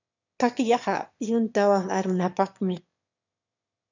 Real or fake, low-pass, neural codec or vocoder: fake; 7.2 kHz; autoencoder, 22.05 kHz, a latent of 192 numbers a frame, VITS, trained on one speaker